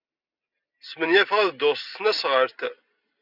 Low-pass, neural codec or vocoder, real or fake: 5.4 kHz; none; real